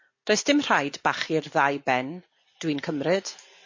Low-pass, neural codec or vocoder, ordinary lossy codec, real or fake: 7.2 kHz; none; MP3, 32 kbps; real